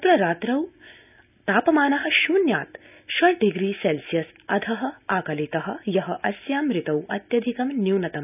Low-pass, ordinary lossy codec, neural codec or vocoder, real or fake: 3.6 kHz; none; none; real